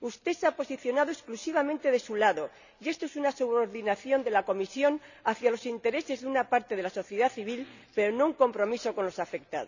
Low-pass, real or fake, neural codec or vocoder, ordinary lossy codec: 7.2 kHz; real; none; none